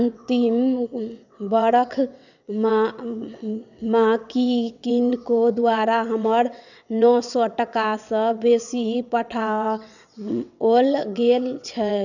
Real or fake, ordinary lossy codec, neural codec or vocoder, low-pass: fake; none; vocoder, 22.05 kHz, 80 mel bands, WaveNeXt; 7.2 kHz